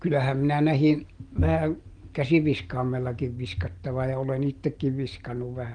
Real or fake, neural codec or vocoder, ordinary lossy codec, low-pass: real; none; Opus, 24 kbps; 9.9 kHz